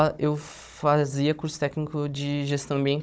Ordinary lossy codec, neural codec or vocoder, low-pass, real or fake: none; codec, 16 kHz, 16 kbps, FunCodec, trained on Chinese and English, 50 frames a second; none; fake